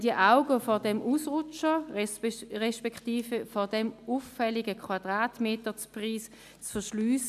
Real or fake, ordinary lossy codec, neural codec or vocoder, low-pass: real; MP3, 96 kbps; none; 14.4 kHz